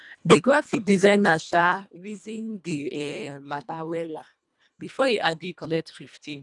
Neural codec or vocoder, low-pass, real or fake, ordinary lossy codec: codec, 24 kHz, 1.5 kbps, HILCodec; 10.8 kHz; fake; none